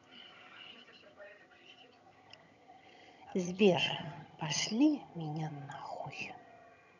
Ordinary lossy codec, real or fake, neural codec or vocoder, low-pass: none; fake; vocoder, 22.05 kHz, 80 mel bands, HiFi-GAN; 7.2 kHz